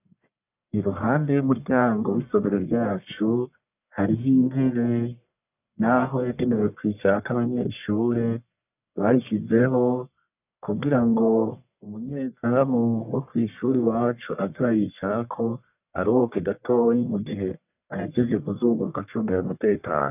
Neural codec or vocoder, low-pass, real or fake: codec, 44.1 kHz, 1.7 kbps, Pupu-Codec; 3.6 kHz; fake